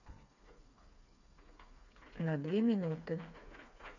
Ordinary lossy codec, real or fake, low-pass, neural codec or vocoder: none; fake; 7.2 kHz; codec, 16 kHz, 4 kbps, FreqCodec, smaller model